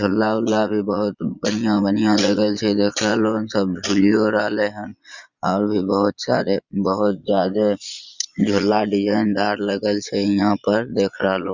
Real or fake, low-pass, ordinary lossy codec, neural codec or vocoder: fake; 7.2 kHz; Opus, 64 kbps; vocoder, 22.05 kHz, 80 mel bands, Vocos